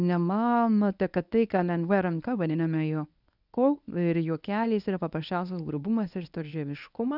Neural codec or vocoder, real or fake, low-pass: codec, 24 kHz, 0.9 kbps, WavTokenizer, medium speech release version 1; fake; 5.4 kHz